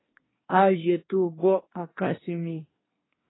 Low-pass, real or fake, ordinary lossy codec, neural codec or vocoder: 7.2 kHz; fake; AAC, 16 kbps; codec, 24 kHz, 1 kbps, SNAC